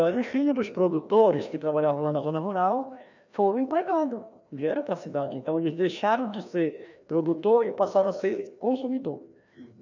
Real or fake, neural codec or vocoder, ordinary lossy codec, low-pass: fake; codec, 16 kHz, 1 kbps, FreqCodec, larger model; none; 7.2 kHz